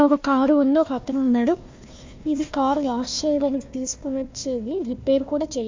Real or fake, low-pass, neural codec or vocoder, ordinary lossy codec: fake; 7.2 kHz; codec, 16 kHz, 1 kbps, FunCodec, trained on Chinese and English, 50 frames a second; MP3, 48 kbps